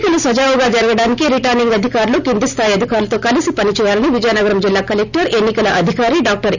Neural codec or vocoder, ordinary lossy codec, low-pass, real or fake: none; none; 7.2 kHz; real